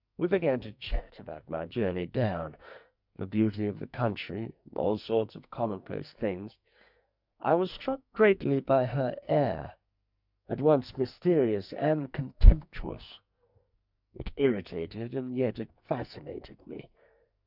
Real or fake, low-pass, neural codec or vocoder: fake; 5.4 kHz; codec, 44.1 kHz, 2.6 kbps, SNAC